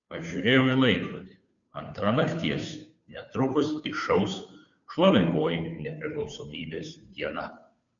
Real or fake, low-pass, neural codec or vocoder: fake; 7.2 kHz; codec, 16 kHz, 2 kbps, FunCodec, trained on Chinese and English, 25 frames a second